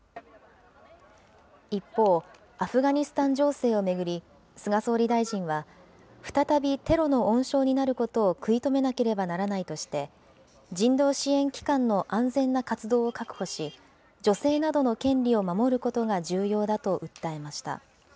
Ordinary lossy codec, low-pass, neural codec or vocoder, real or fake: none; none; none; real